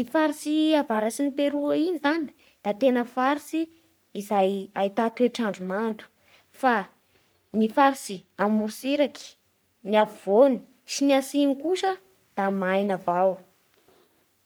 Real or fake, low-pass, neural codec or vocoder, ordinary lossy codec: fake; none; codec, 44.1 kHz, 3.4 kbps, Pupu-Codec; none